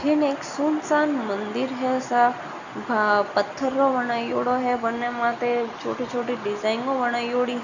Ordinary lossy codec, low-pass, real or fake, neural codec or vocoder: none; 7.2 kHz; real; none